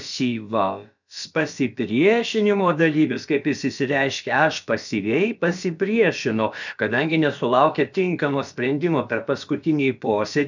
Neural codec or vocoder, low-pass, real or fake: codec, 16 kHz, about 1 kbps, DyCAST, with the encoder's durations; 7.2 kHz; fake